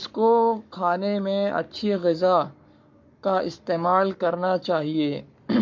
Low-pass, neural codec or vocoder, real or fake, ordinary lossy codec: 7.2 kHz; codec, 44.1 kHz, 7.8 kbps, Pupu-Codec; fake; MP3, 48 kbps